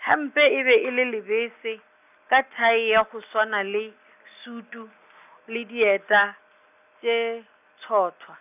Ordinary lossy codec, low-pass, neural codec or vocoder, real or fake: none; 3.6 kHz; none; real